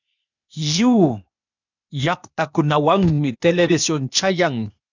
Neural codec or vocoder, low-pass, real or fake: codec, 16 kHz, 0.8 kbps, ZipCodec; 7.2 kHz; fake